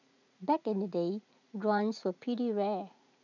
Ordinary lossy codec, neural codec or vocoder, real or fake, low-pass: none; vocoder, 44.1 kHz, 80 mel bands, Vocos; fake; 7.2 kHz